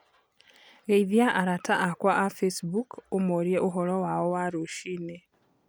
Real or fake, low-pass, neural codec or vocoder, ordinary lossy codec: real; none; none; none